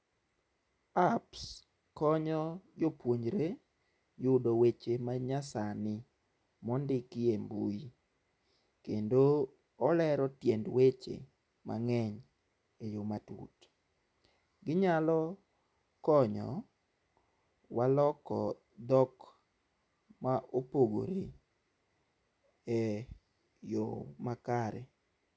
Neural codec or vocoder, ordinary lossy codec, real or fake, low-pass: none; none; real; none